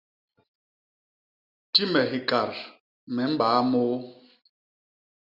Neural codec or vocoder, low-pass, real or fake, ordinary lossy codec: none; 5.4 kHz; real; Opus, 64 kbps